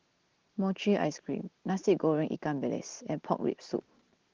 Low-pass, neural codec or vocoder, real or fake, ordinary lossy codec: 7.2 kHz; none; real; Opus, 16 kbps